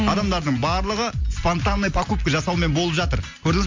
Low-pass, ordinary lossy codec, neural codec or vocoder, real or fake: 7.2 kHz; MP3, 48 kbps; none; real